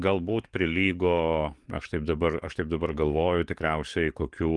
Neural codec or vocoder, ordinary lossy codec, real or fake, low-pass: codec, 44.1 kHz, 7.8 kbps, DAC; Opus, 24 kbps; fake; 10.8 kHz